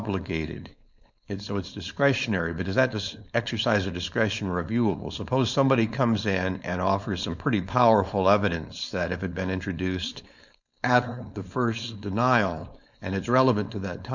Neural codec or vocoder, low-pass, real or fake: codec, 16 kHz, 4.8 kbps, FACodec; 7.2 kHz; fake